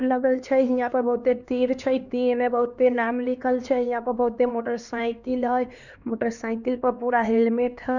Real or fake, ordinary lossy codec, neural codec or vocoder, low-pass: fake; none; codec, 16 kHz, 2 kbps, X-Codec, HuBERT features, trained on LibriSpeech; 7.2 kHz